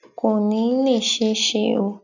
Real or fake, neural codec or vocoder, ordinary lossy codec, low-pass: real; none; none; 7.2 kHz